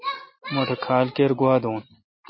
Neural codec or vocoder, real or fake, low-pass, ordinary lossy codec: none; real; 7.2 kHz; MP3, 24 kbps